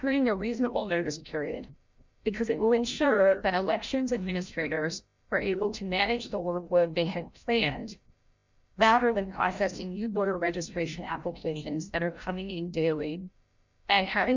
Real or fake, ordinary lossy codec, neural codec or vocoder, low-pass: fake; MP3, 64 kbps; codec, 16 kHz, 0.5 kbps, FreqCodec, larger model; 7.2 kHz